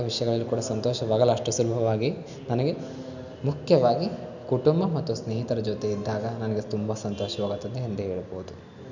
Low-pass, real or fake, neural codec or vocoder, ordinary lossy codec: 7.2 kHz; real; none; none